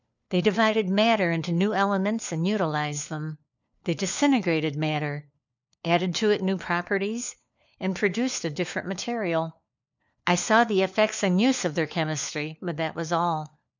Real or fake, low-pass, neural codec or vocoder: fake; 7.2 kHz; codec, 16 kHz, 4 kbps, FunCodec, trained on LibriTTS, 50 frames a second